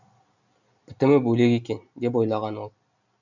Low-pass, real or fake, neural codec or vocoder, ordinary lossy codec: 7.2 kHz; real; none; none